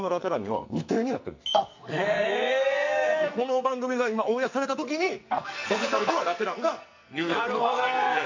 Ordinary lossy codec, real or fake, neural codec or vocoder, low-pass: MP3, 64 kbps; fake; codec, 44.1 kHz, 2.6 kbps, SNAC; 7.2 kHz